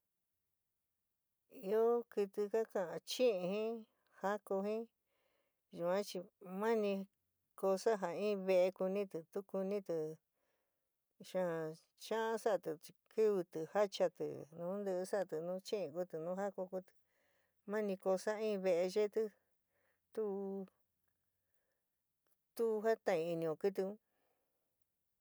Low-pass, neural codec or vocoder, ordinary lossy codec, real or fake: none; none; none; real